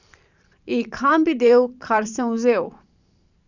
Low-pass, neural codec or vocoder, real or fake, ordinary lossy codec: 7.2 kHz; vocoder, 44.1 kHz, 128 mel bands every 512 samples, BigVGAN v2; fake; none